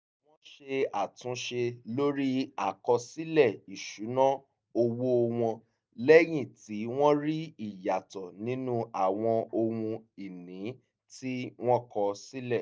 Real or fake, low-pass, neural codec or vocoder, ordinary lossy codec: real; none; none; none